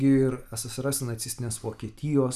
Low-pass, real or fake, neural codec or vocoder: 14.4 kHz; real; none